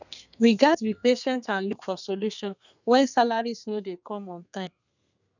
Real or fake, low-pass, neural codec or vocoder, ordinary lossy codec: fake; 7.2 kHz; codec, 32 kHz, 1.9 kbps, SNAC; none